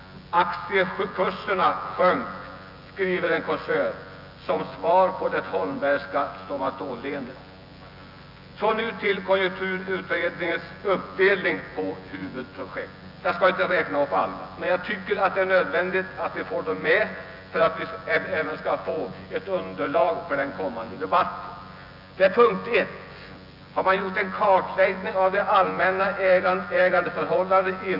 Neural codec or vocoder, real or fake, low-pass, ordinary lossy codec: vocoder, 24 kHz, 100 mel bands, Vocos; fake; 5.4 kHz; AAC, 48 kbps